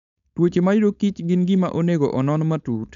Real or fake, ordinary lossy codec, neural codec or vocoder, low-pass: fake; none; codec, 16 kHz, 4.8 kbps, FACodec; 7.2 kHz